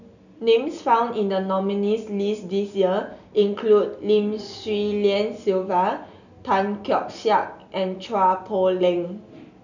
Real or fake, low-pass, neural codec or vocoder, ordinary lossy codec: real; 7.2 kHz; none; none